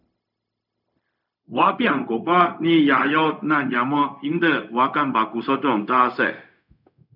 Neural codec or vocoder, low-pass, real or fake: codec, 16 kHz, 0.4 kbps, LongCat-Audio-Codec; 5.4 kHz; fake